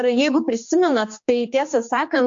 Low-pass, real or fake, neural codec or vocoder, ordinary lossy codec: 7.2 kHz; fake; codec, 16 kHz, 2 kbps, X-Codec, HuBERT features, trained on general audio; MP3, 48 kbps